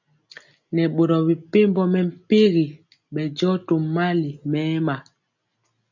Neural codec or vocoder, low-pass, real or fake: none; 7.2 kHz; real